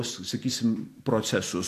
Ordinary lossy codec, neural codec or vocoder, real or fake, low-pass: AAC, 64 kbps; none; real; 14.4 kHz